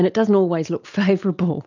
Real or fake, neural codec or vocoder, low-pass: real; none; 7.2 kHz